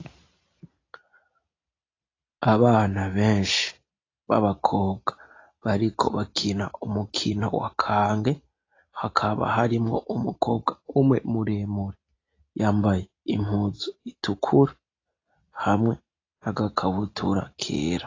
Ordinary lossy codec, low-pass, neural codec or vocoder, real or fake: AAC, 32 kbps; 7.2 kHz; none; real